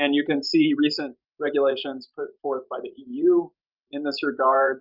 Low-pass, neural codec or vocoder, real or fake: 5.4 kHz; vocoder, 44.1 kHz, 128 mel bands every 512 samples, BigVGAN v2; fake